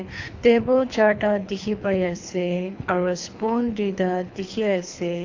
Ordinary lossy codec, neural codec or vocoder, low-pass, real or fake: AAC, 48 kbps; codec, 24 kHz, 3 kbps, HILCodec; 7.2 kHz; fake